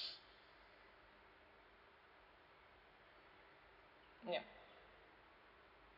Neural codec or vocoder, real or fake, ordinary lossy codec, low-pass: none; real; none; 5.4 kHz